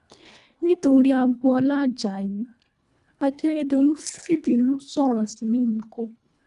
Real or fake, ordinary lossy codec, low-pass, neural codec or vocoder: fake; none; 10.8 kHz; codec, 24 kHz, 1.5 kbps, HILCodec